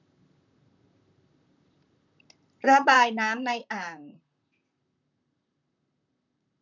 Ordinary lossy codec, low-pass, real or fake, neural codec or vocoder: none; 7.2 kHz; fake; vocoder, 44.1 kHz, 128 mel bands, Pupu-Vocoder